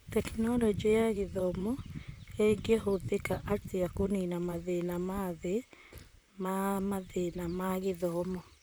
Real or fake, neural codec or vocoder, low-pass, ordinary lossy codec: fake; vocoder, 44.1 kHz, 128 mel bands, Pupu-Vocoder; none; none